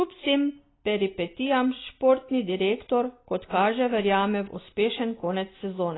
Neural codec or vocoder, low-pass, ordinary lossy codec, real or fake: none; 7.2 kHz; AAC, 16 kbps; real